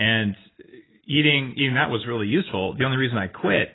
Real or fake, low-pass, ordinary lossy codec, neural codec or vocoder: real; 7.2 kHz; AAC, 16 kbps; none